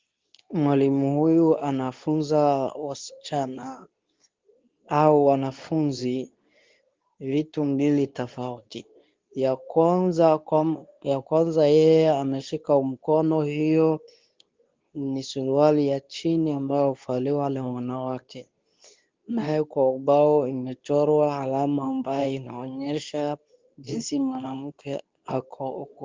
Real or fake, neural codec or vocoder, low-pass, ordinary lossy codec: fake; codec, 24 kHz, 0.9 kbps, WavTokenizer, medium speech release version 2; 7.2 kHz; Opus, 32 kbps